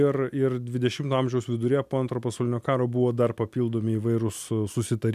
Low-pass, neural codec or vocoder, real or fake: 14.4 kHz; none; real